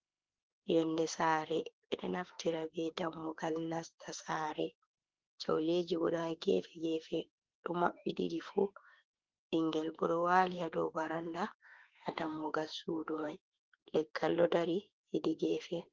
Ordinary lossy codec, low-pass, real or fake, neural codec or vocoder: Opus, 16 kbps; 7.2 kHz; fake; autoencoder, 48 kHz, 32 numbers a frame, DAC-VAE, trained on Japanese speech